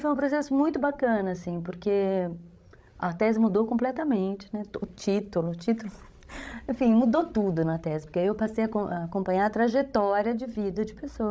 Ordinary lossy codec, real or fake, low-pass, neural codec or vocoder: none; fake; none; codec, 16 kHz, 16 kbps, FreqCodec, larger model